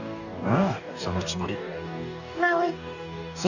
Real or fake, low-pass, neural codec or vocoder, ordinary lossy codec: fake; 7.2 kHz; codec, 44.1 kHz, 2.6 kbps, DAC; none